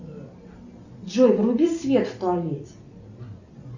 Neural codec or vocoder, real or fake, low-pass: none; real; 7.2 kHz